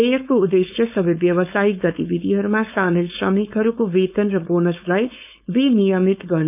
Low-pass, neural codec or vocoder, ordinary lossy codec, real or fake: 3.6 kHz; codec, 16 kHz, 4.8 kbps, FACodec; none; fake